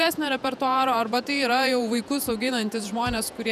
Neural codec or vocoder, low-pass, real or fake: vocoder, 48 kHz, 128 mel bands, Vocos; 14.4 kHz; fake